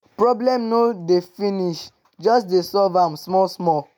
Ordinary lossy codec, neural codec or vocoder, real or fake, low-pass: none; none; real; none